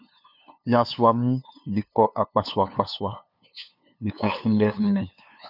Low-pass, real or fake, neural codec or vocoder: 5.4 kHz; fake; codec, 16 kHz, 2 kbps, FunCodec, trained on LibriTTS, 25 frames a second